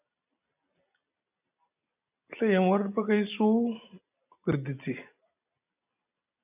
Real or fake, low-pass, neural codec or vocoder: real; 3.6 kHz; none